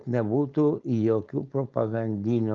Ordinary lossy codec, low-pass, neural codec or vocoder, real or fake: Opus, 32 kbps; 7.2 kHz; codec, 16 kHz, 4.8 kbps, FACodec; fake